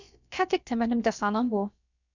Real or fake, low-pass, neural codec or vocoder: fake; 7.2 kHz; codec, 16 kHz, about 1 kbps, DyCAST, with the encoder's durations